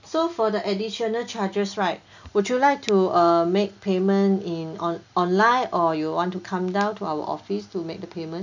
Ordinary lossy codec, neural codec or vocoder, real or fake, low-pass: none; none; real; 7.2 kHz